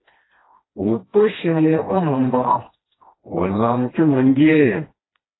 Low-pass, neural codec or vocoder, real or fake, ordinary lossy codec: 7.2 kHz; codec, 16 kHz, 1 kbps, FreqCodec, smaller model; fake; AAC, 16 kbps